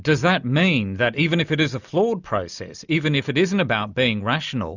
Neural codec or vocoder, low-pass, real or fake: none; 7.2 kHz; real